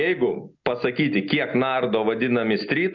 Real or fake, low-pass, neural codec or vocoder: real; 7.2 kHz; none